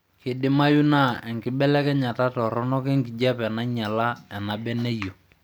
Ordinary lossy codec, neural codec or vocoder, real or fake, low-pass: none; none; real; none